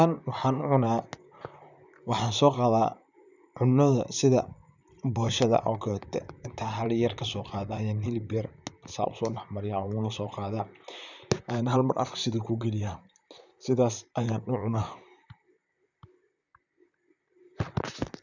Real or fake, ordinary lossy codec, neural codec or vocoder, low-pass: fake; none; vocoder, 44.1 kHz, 128 mel bands, Pupu-Vocoder; 7.2 kHz